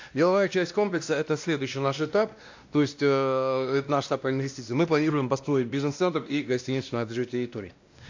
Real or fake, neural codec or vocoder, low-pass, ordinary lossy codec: fake; codec, 16 kHz, 1 kbps, X-Codec, WavLM features, trained on Multilingual LibriSpeech; 7.2 kHz; AAC, 48 kbps